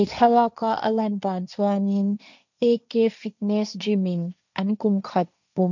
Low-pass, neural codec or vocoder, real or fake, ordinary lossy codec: 7.2 kHz; codec, 16 kHz, 1.1 kbps, Voila-Tokenizer; fake; none